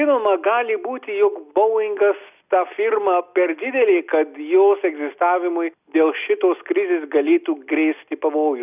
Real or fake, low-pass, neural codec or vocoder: real; 3.6 kHz; none